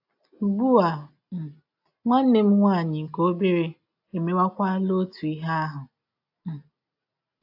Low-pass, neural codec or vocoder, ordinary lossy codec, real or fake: 5.4 kHz; none; AAC, 48 kbps; real